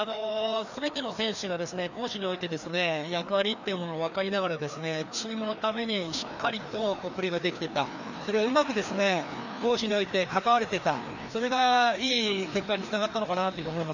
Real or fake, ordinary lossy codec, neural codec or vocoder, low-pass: fake; none; codec, 16 kHz, 2 kbps, FreqCodec, larger model; 7.2 kHz